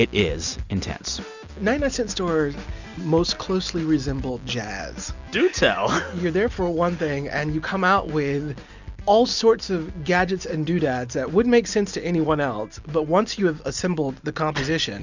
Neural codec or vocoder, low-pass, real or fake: none; 7.2 kHz; real